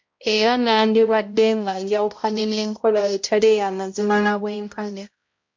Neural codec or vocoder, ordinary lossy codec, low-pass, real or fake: codec, 16 kHz, 0.5 kbps, X-Codec, HuBERT features, trained on balanced general audio; MP3, 48 kbps; 7.2 kHz; fake